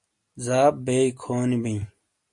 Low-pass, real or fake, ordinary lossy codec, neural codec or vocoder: 10.8 kHz; real; MP3, 48 kbps; none